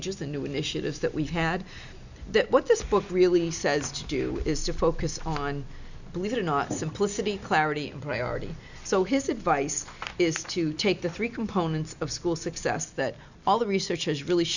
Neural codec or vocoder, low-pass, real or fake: none; 7.2 kHz; real